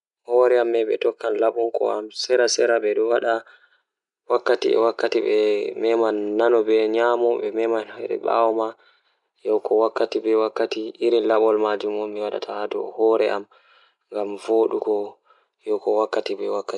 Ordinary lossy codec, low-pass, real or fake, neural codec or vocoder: none; 10.8 kHz; real; none